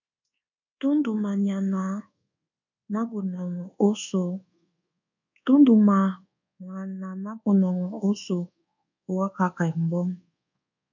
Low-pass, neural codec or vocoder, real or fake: 7.2 kHz; codec, 24 kHz, 1.2 kbps, DualCodec; fake